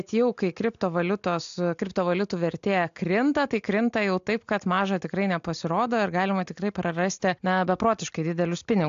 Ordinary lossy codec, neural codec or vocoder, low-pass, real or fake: AAC, 64 kbps; none; 7.2 kHz; real